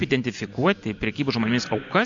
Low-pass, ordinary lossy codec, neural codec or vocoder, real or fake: 7.2 kHz; MP3, 64 kbps; none; real